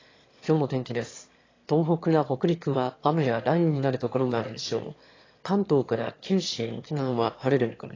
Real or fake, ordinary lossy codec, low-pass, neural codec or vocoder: fake; AAC, 32 kbps; 7.2 kHz; autoencoder, 22.05 kHz, a latent of 192 numbers a frame, VITS, trained on one speaker